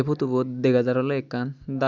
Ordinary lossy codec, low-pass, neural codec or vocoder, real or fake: none; 7.2 kHz; none; real